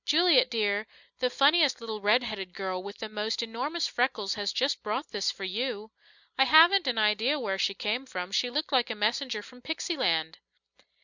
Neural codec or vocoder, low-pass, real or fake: none; 7.2 kHz; real